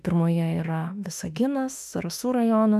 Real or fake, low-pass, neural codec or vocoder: fake; 14.4 kHz; autoencoder, 48 kHz, 32 numbers a frame, DAC-VAE, trained on Japanese speech